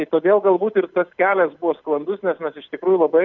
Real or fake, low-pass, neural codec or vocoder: real; 7.2 kHz; none